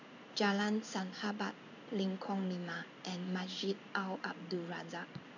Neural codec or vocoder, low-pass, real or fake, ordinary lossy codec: codec, 16 kHz in and 24 kHz out, 1 kbps, XY-Tokenizer; 7.2 kHz; fake; none